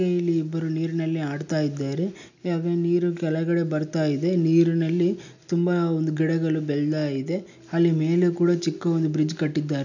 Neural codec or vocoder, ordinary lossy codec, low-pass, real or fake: none; none; 7.2 kHz; real